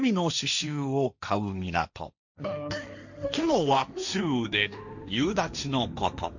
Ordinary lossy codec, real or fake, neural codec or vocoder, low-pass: none; fake; codec, 16 kHz, 1.1 kbps, Voila-Tokenizer; 7.2 kHz